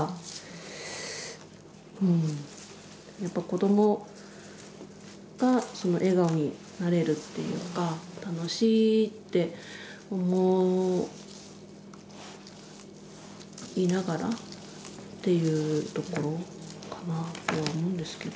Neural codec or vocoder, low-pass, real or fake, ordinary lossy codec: none; none; real; none